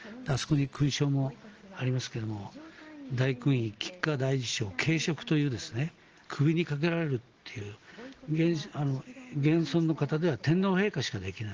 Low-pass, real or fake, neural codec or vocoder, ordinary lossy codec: 7.2 kHz; real; none; Opus, 16 kbps